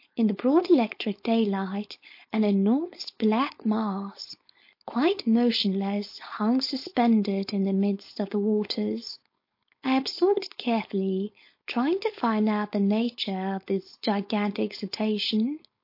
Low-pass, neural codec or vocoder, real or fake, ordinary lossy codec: 5.4 kHz; codec, 16 kHz, 4.8 kbps, FACodec; fake; MP3, 32 kbps